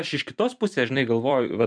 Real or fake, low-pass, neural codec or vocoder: fake; 9.9 kHz; vocoder, 44.1 kHz, 128 mel bands every 256 samples, BigVGAN v2